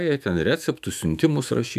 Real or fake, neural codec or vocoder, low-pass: fake; autoencoder, 48 kHz, 128 numbers a frame, DAC-VAE, trained on Japanese speech; 14.4 kHz